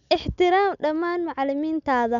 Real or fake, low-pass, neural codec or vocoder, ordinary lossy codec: real; 7.2 kHz; none; MP3, 96 kbps